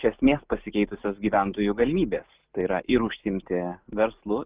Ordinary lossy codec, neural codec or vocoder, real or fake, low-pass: Opus, 32 kbps; none; real; 3.6 kHz